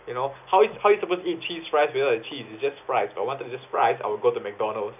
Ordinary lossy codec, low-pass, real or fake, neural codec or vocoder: none; 3.6 kHz; real; none